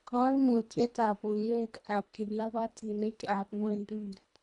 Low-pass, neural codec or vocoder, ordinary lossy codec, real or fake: 10.8 kHz; codec, 24 kHz, 1.5 kbps, HILCodec; none; fake